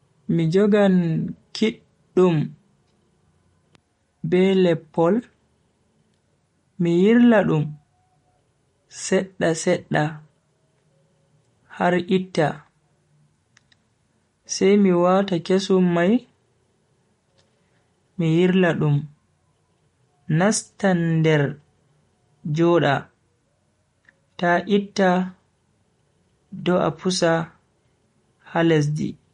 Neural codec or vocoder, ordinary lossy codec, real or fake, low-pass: none; MP3, 48 kbps; real; 19.8 kHz